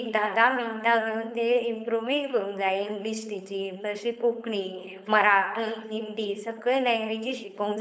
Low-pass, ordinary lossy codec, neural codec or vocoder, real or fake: none; none; codec, 16 kHz, 4.8 kbps, FACodec; fake